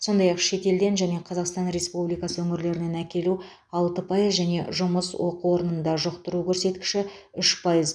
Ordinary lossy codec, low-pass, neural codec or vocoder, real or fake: none; 9.9 kHz; none; real